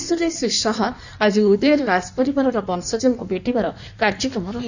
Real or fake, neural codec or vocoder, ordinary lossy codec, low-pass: fake; codec, 16 kHz in and 24 kHz out, 1.1 kbps, FireRedTTS-2 codec; none; 7.2 kHz